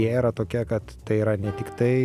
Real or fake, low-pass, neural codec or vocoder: real; 14.4 kHz; none